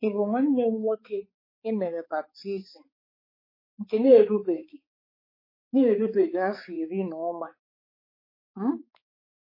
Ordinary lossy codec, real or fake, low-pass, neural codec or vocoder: MP3, 24 kbps; fake; 5.4 kHz; codec, 16 kHz, 4 kbps, X-Codec, HuBERT features, trained on balanced general audio